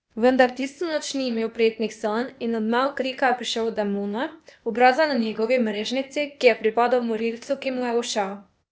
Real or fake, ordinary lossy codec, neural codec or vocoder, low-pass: fake; none; codec, 16 kHz, 0.8 kbps, ZipCodec; none